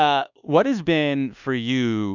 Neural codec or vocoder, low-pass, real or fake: codec, 24 kHz, 1.2 kbps, DualCodec; 7.2 kHz; fake